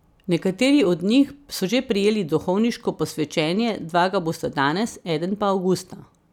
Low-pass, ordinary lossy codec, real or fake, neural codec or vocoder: 19.8 kHz; none; real; none